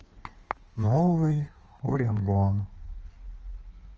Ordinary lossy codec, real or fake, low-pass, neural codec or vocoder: Opus, 24 kbps; fake; 7.2 kHz; codec, 16 kHz in and 24 kHz out, 1.1 kbps, FireRedTTS-2 codec